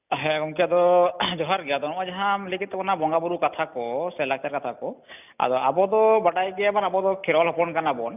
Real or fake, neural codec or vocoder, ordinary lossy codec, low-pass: real; none; none; 3.6 kHz